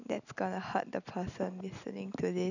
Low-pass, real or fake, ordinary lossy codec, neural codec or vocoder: 7.2 kHz; real; none; none